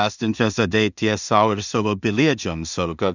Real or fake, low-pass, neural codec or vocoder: fake; 7.2 kHz; codec, 16 kHz in and 24 kHz out, 0.4 kbps, LongCat-Audio-Codec, two codebook decoder